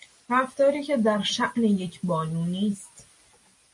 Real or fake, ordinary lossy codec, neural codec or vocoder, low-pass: real; MP3, 48 kbps; none; 10.8 kHz